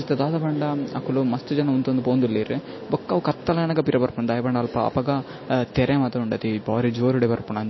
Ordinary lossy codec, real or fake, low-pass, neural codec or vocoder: MP3, 24 kbps; real; 7.2 kHz; none